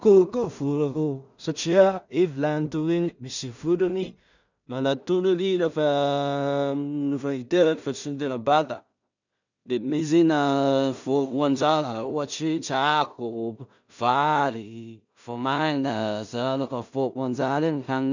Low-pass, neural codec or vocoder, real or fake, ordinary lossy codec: 7.2 kHz; codec, 16 kHz in and 24 kHz out, 0.4 kbps, LongCat-Audio-Codec, two codebook decoder; fake; none